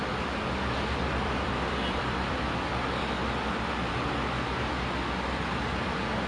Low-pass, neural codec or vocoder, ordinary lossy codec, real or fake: 9.9 kHz; vocoder, 44.1 kHz, 128 mel bands every 256 samples, BigVGAN v2; AAC, 32 kbps; fake